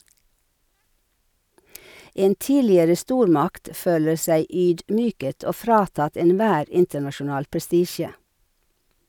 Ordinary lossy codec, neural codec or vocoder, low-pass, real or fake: none; none; 19.8 kHz; real